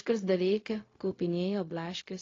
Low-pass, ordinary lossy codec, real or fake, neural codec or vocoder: 7.2 kHz; AAC, 32 kbps; fake; codec, 16 kHz, 0.4 kbps, LongCat-Audio-Codec